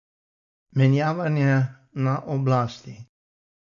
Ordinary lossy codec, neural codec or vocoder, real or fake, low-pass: MP3, 64 kbps; none; real; 7.2 kHz